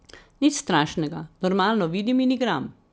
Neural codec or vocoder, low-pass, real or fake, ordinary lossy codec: none; none; real; none